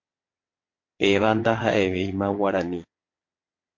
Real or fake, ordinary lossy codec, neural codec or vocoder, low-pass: fake; MP3, 48 kbps; vocoder, 22.05 kHz, 80 mel bands, WaveNeXt; 7.2 kHz